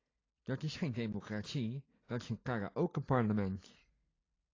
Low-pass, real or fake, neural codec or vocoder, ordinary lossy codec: 7.2 kHz; real; none; AAC, 32 kbps